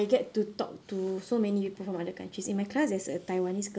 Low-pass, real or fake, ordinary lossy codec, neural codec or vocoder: none; real; none; none